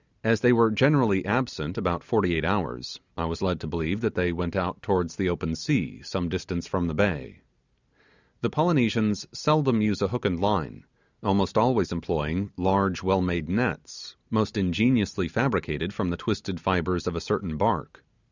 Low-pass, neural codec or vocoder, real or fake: 7.2 kHz; none; real